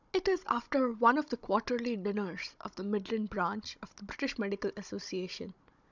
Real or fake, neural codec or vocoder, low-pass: fake; codec, 16 kHz, 16 kbps, FunCodec, trained on Chinese and English, 50 frames a second; 7.2 kHz